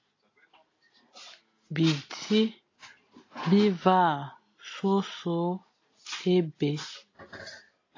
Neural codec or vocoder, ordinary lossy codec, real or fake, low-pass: none; AAC, 32 kbps; real; 7.2 kHz